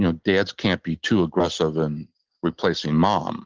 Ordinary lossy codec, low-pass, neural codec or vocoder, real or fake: Opus, 16 kbps; 7.2 kHz; none; real